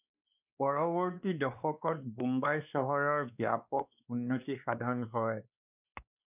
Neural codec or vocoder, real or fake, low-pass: codec, 16 kHz, 2 kbps, X-Codec, WavLM features, trained on Multilingual LibriSpeech; fake; 3.6 kHz